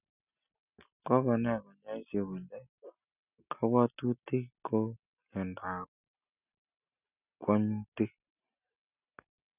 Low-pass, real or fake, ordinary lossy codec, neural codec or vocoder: 3.6 kHz; real; none; none